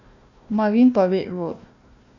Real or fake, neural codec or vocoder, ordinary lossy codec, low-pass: fake; codec, 16 kHz, 1 kbps, FunCodec, trained on Chinese and English, 50 frames a second; none; 7.2 kHz